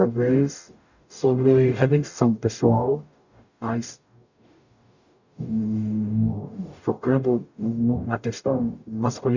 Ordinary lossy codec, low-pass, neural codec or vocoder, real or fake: none; 7.2 kHz; codec, 44.1 kHz, 0.9 kbps, DAC; fake